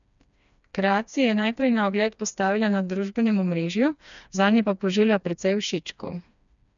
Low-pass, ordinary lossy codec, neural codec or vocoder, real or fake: 7.2 kHz; none; codec, 16 kHz, 2 kbps, FreqCodec, smaller model; fake